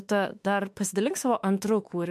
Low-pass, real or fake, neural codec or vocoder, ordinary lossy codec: 14.4 kHz; fake; autoencoder, 48 kHz, 32 numbers a frame, DAC-VAE, trained on Japanese speech; MP3, 64 kbps